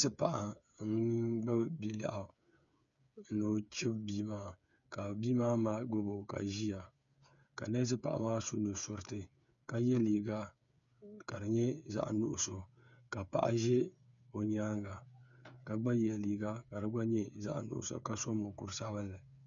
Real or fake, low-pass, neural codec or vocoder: fake; 7.2 kHz; codec, 16 kHz, 8 kbps, FreqCodec, smaller model